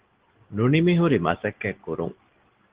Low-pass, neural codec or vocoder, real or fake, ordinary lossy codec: 3.6 kHz; none; real; Opus, 16 kbps